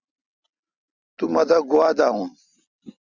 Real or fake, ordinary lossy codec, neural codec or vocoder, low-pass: real; Opus, 64 kbps; none; 7.2 kHz